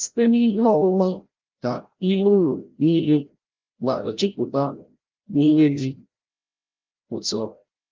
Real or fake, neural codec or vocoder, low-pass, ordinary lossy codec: fake; codec, 16 kHz, 0.5 kbps, FreqCodec, larger model; 7.2 kHz; Opus, 32 kbps